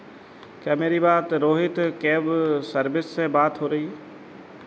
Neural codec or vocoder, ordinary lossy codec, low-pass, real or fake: none; none; none; real